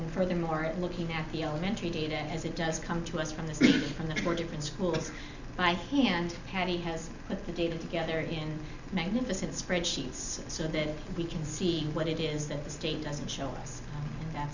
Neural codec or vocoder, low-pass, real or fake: none; 7.2 kHz; real